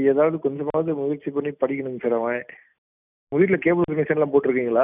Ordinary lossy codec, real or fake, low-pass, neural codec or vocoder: none; real; 3.6 kHz; none